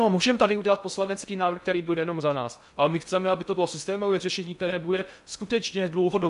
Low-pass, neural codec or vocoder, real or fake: 10.8 kHz; codec, 16 kHz in and 24 kHz out, 0.6 kbps, FocalCodec, streaming, 2048 codes; fake